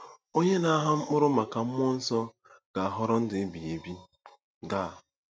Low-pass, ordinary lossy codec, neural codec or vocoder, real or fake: none; none; none; real